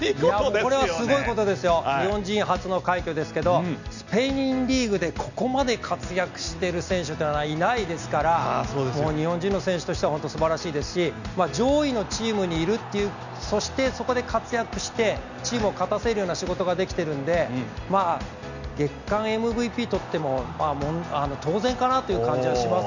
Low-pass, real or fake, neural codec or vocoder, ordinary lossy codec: 7.2 kHz; real; none; none